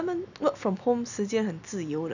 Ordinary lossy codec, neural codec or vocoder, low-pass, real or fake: none; none; 7.2 kHz; real